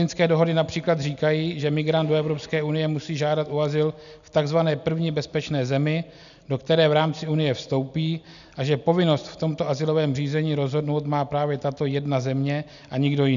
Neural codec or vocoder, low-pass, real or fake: none; 7.2 kHz; real